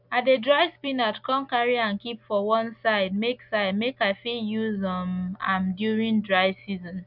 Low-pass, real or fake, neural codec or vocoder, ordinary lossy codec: 5.4 kHz; real; none; none